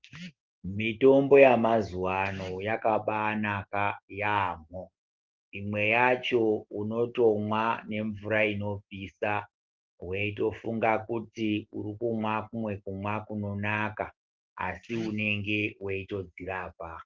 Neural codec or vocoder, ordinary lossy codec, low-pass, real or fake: none; Opus, 16 kbps; 7.2 kHz; real